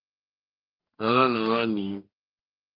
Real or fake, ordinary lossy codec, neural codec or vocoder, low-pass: fake; Opus, 24 kbps; codec, 44.1 kHz, 2.6 kbps, DAC; 5.4 kHz